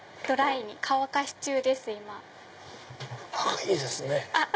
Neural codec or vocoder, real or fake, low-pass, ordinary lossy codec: none; real; none; none